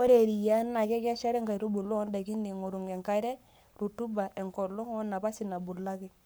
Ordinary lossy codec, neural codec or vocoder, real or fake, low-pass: none; codec, 44.1 kHz, 7.8 kbps, Pupu-Codec; fake; none